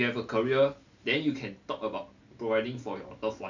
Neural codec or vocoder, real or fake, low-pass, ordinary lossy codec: none; real; 7.2 kHz; none